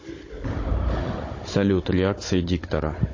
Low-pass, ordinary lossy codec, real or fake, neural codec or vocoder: 7.2 kHz; MP3, 32 kbps; fake; codec, 16 kHz, 16 kbps, FunCodec, trained on Chinese and English, 50 frames a second